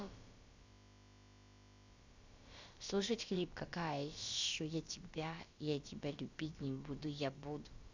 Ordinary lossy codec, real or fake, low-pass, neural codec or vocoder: Opus, 64 kbps; fake; 7.2 kHz; codec, 16 kHz, about 1 kbps, DyCAST, with the encoder's durations